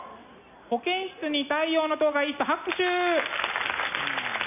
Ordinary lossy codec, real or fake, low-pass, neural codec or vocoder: none; real; 3.6 kHz; none